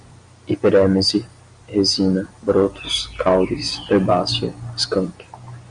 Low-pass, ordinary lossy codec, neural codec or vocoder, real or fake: 9.9 kHz; Opus, 64 kbps; none; real